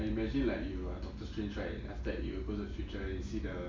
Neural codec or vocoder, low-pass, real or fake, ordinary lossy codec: none; 7.2 kHz; real; MP3, 64 kbps